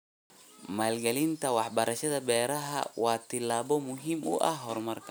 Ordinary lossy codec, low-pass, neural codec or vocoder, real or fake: none; none; none; real